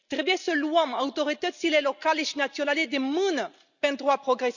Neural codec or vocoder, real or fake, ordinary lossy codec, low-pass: none; real; none; 7.2 kHz